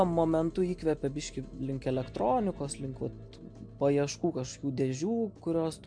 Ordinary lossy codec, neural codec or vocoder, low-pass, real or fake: MP3, 64 kbps; none; 9.9 kHz; real